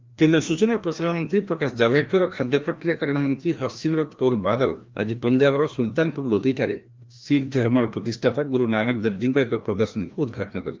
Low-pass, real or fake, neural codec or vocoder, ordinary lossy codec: 7.2 kHz; fake; codec, 16 kHz, 1 kbps, FreqCodec, larger model; Opus, 32 kbps